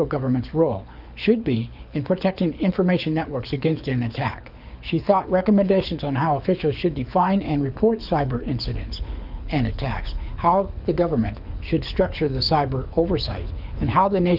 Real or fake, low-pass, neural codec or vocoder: fake; 5.4 kHz; codec, 24 kHz, 6 kbps, HILCodec